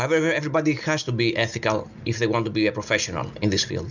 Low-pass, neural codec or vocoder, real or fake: 7.2 kHz; none; real